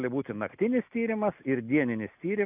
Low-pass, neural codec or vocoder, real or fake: 3.6 kHz; none; real